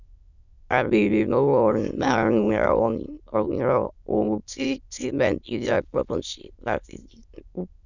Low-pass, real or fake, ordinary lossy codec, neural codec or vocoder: 7.2 kHz; fake; none; autoencoder, 22.05 kHz, a latent of 192 numbers a frame, VITS, trained on many speakers